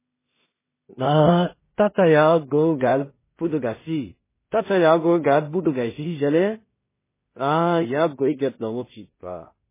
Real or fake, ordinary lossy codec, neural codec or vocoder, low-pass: fake; MP3, 16 kbps; codec, 16 kHz in and 24 kHz out, 0.4 kbps, LongCat-Audio-Codec, two codebook decoder; 3.6 kHz